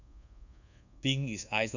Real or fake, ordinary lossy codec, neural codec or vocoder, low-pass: fake; none; codec, 24 kHz, 1.2 kbps, DualCodec; 7.2 kHz